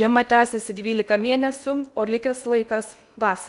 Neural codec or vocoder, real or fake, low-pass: codec, 16 kHz in and 24 kHz out, 0.8 kbps, FocalCodec, streaming, 65536 codes; fake; 10.8 kHz